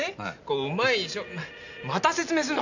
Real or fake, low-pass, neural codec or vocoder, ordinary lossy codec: real; 7.2 kHz; none; none